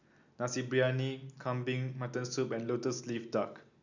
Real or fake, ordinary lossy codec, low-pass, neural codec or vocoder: real; none; 7.2 kHz; none